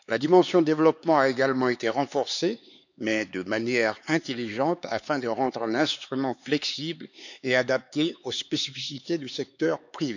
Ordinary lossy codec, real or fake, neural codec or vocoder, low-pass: none; fake; codec, 16 kHz, 4 kbps, X-Codec, HuBERT features, trained on LibriSpeech; 7.2 kHz